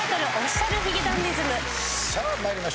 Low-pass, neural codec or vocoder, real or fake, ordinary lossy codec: none; none; real; none